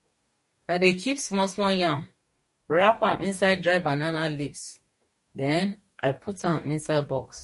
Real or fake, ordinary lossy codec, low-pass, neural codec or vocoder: fake; MP3, 48 kbps; 14.4 kHz; codec, 44.1 kHz, 2.6 kbps, DAC